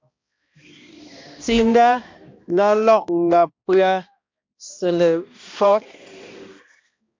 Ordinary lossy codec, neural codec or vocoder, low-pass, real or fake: MP3, 48 kbps; codec, 16 kHz, 1 kbps, X-Codec, HuBERT features, trained on balanced general audio; 7.2 kHz; fake